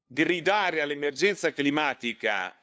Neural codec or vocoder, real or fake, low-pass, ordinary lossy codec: codec, 16 kHz, 2 kbps, FunCodec, trained on LibriTTS, 25 frames a second; fake; none; none